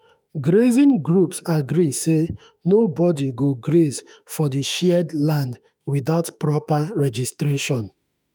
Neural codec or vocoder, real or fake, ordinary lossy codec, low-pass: autoencoder, 48 kHz, 32 numbers a frame, DAC-VAE, trained on Japanese speech; fake; none; none